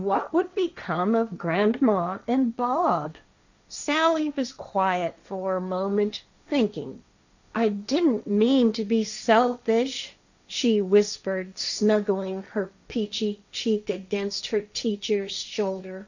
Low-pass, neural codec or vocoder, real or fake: 7.2 kHz; codec, 16 kHz, 1.1 kbps, Voila-Tokenizer; fake